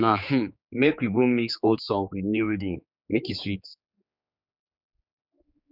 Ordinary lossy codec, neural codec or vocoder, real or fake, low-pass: none; codec, 16 kHz, 4 kbps, X-Codec, HuBERT features, trained on general audio; fake; 5.4 kHz